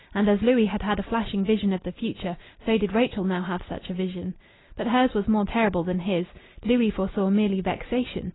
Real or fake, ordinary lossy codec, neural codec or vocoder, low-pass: real; AAC, 16 kbps; none; 7.2 kHz